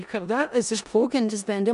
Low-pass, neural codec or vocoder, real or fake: 10.8 kHz; codec, 16 kHz in and 24 kHz out, 0.4 kbps, LongCat-Audio-Codec, four codebook decoder; fake